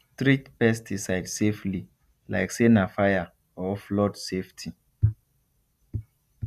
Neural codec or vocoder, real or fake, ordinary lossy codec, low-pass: none; real; none; 14.4 kHz